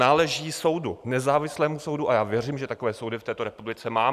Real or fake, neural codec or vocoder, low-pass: real; none; 14.4 kHz